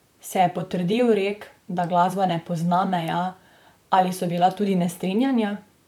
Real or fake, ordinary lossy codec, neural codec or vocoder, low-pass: fake; none; vocoder, 44.1 kHz, 128 mel bands, Pupu-Vocoder; 19.8 kHz